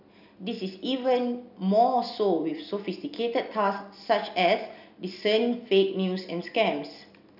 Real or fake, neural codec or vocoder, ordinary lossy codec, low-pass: real; none; none; 5.4 kHz